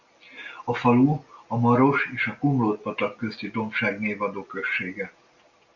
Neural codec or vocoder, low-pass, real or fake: none; 7.2 kHz; real